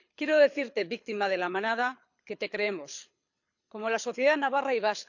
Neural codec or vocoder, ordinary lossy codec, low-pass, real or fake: codec, 24 kHz, 6 kbps, HILCodec; none; 7.2 kHz; fake